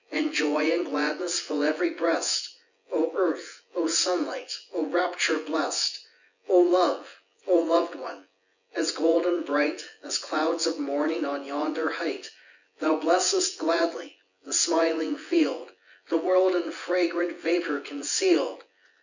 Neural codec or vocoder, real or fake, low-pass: vocoder, 24 kHz, 100 mel bands, Vocos; fake; 7.2 kHz